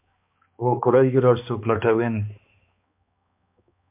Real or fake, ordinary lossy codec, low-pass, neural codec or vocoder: fake; MP3, 32 kbps; 3.6 kHz; codec, 16 kHz, 2 kbps, X-Codec, HuBERT features, trained on balanced general audio